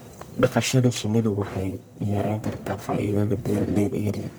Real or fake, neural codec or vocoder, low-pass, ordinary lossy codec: fake; codec, 44.1 kHz, 1.7 kbps, Pupu-Codec; none; none